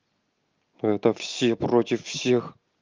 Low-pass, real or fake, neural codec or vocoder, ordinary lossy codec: 7.2 kHz; real; none; Opus, 24 kbps